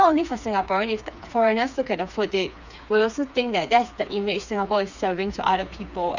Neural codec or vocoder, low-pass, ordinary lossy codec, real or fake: codec, 16 kHz, 4 kbps, FreqCodec, smaller model; 7.2 kHz; none; fake